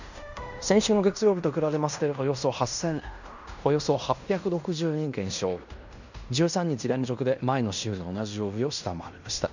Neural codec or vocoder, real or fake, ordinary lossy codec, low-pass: codec, 16 kHz in and 24 kHz out, 0.9 kbps, LongCat-Audio-Codec, fine tuned four codebook decoder; fake; none; 7.2 kHz